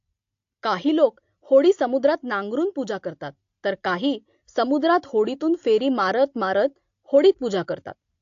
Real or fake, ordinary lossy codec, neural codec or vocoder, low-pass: real; AAC, 48 kbps; none; 7.2 kHz